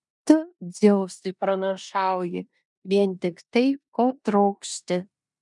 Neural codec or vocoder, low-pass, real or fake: codec, 16 kHz in and 24 kHz out, 0.9 kbps, LongCat-Audio-Codec, four codebook decoder; 10.8 kHz; fake